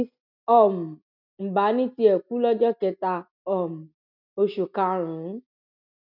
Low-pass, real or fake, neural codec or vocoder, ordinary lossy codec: 5.4 kHz; real; none; none